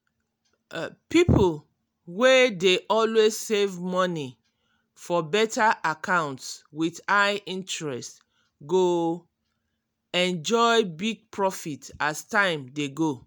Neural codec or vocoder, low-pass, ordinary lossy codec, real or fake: none; none; none; real